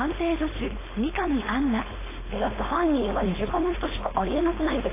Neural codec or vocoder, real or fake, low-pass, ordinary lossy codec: codec, 16 kHz, 4.8 kbps, FACodec; fake; 3.6 kHz; AAC, 16 kbps